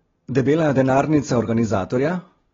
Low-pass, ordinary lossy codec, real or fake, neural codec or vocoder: 7.2 kHz; AAC, 24 kbps; real; none